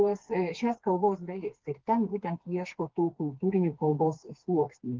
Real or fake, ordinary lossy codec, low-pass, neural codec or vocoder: fake; Opus, 16 kbps; 7.2 kHz; codec, 16 kHz, 4 kbps, FreqCodec, smaller model